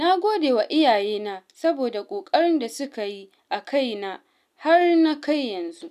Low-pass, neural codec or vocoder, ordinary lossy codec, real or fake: 14.4 kHz; none; none; real